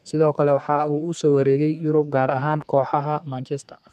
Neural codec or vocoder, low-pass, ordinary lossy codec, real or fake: codec, 32 kHz, 1.9 kbps, SNAC; 14.4 kHz; none; fake